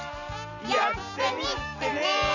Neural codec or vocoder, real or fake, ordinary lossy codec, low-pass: none; real; none; 7.2 kHz